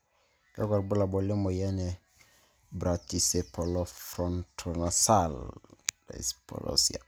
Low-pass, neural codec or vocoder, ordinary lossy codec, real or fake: none; none; none; real